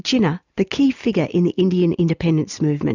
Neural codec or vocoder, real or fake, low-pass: none; real; 7.2 kHz